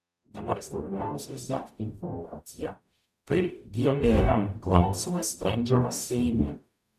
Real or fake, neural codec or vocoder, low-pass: fake; codec, 44.1 kHz, 0.9 kbps, DAC; 14.4 kHz